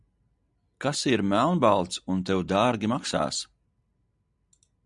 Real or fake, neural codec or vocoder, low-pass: real; none; 10.8 kHz